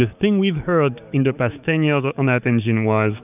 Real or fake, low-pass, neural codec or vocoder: fake; 3.6 kHz; codec, 16 kHz, 6 kbps, DAC